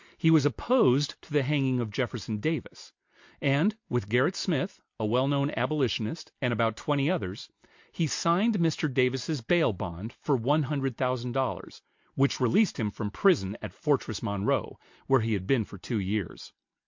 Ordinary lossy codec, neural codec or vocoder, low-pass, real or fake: MP3, 48 kbps; none; 7.2 kHz; real